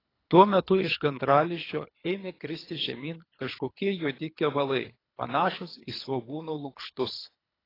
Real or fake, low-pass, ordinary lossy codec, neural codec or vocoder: fake; 5.4 kHz; AAC, 24 kbps; codec, 24 kHz, 3 kbps, HILCodec